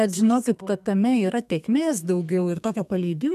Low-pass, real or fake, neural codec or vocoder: 14.4 kHz; fake; codec, 32 kHz, 1.9 kbps, SNAC